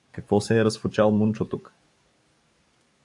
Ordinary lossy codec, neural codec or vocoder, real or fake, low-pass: AAC, 64 kbps; codec, 44.1 kHz, 7.8 kbps, DAC; fake; 10.8 kHz